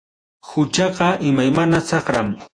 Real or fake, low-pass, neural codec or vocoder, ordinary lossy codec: fake; 9.9 kHz; vocoder, 48 kHz, 128 mel bands, Vocos; AAC, 48 kbps